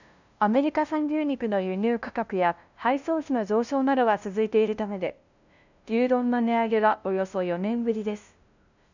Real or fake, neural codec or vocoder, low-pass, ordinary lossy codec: fake; codec, 16 kHz, 0.5 kbps, FunCodec, trained on LibriTTS, 25 frames a second; 7.2 kHz; none